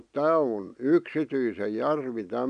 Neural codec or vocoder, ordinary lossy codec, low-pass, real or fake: none; none; 9.9 kHz; real